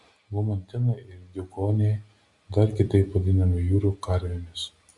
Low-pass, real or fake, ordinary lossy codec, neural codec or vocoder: 10.8 kHz; real; Opus, 64 kbps; none